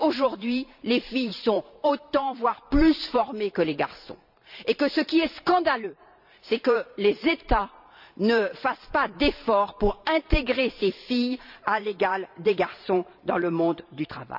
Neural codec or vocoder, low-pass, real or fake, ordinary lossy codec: vocoder, 44.1 kHz, 128 mel bands every 512 samples, BigVGAN v2; 5.4 kHz; fake; none